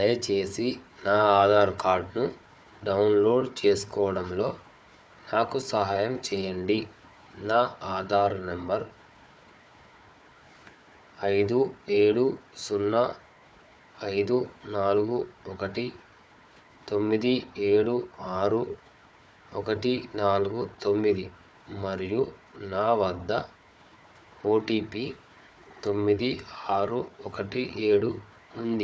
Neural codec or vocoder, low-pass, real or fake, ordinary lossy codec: codec, 16 kHz, 4 kbps, FunCodec, trained on Chinese and English, 50 frames a second; none; fake; none